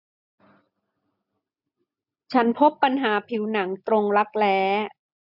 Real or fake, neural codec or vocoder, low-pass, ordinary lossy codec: real; none; 5.4 kHz; none